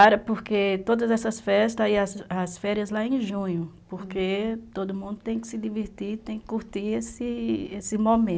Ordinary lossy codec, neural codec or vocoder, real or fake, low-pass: none; none; real; none